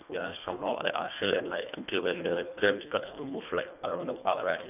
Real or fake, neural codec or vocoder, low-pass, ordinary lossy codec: fake; codec, 24 kHz, 1.5 kbps, HILCodec; 3.6 kHz; none